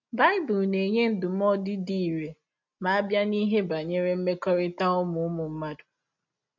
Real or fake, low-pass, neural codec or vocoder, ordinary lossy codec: real; 7.2 kHz; none; MP3, 48 kbps